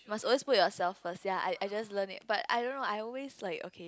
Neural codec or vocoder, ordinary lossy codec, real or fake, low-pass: none; none; real; none